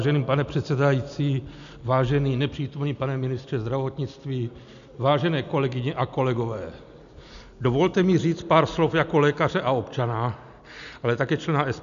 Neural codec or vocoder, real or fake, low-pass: none; real; 7.2 kHz